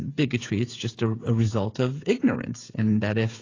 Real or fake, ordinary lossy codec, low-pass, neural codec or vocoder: fake; AAC, 32 kbps; 7.2 kHz; codec, 16 kHz, 8 kbps, FreqCodec, smaller model